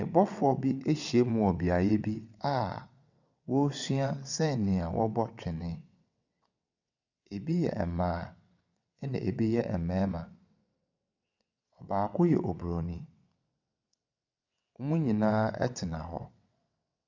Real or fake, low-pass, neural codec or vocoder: fake; 7.2 kHz; vocoder, 22.05 kHz, 80 mel bands, WaveNeXt